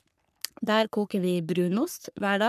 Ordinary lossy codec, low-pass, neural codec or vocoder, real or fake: none; 14.4 kHz; codec, 44.1 kHz, 3.4 kbps, Pupu-Codec; fake